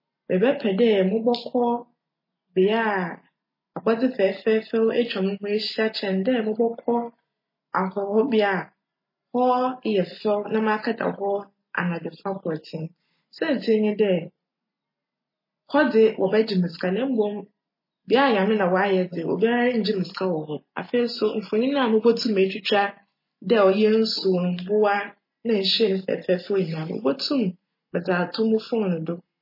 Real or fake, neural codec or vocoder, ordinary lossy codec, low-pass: real; none; MP3, 24 kbps; 5.4 kHz